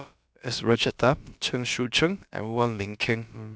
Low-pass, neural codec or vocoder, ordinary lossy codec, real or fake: none; codec, 16 kHz, about 1 kbps, DyCAST, with the encoder's durations; none; fake